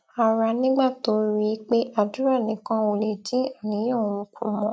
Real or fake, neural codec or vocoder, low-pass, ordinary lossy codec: real; none; none; none